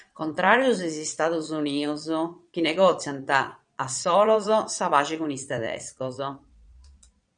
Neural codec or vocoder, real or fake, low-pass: vocoder, 22.05 kHz, 80 mel bands, Vocos; fake; 9.9 kHz